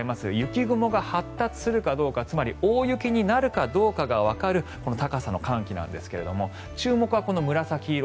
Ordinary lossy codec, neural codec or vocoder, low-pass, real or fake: none; none; none; real